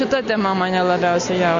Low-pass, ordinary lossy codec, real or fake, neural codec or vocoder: 7.2 kHz; AAC, 48 kbps; real; none